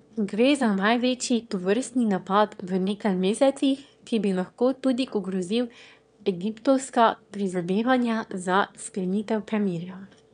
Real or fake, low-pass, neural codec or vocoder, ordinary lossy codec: fake; 9.9 kHz; autoencoder, 22.05 kHz, a latent of 192 numbers a frame, VITS, trained on one speaker; MP3, 96 kbps